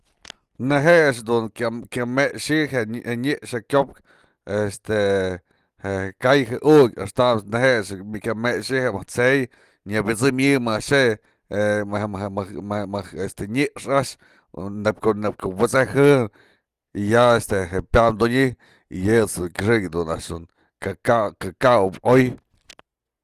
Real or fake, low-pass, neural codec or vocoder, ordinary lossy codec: real; 14.4 kHz; none; Opus, 24 kbps